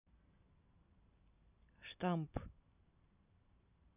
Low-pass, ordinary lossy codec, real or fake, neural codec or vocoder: 3.6 kHz; none; real; none